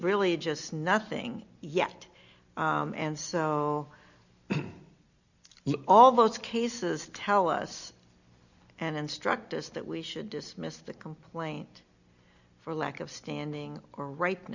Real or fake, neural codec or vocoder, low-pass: real; none; 7.2 kHz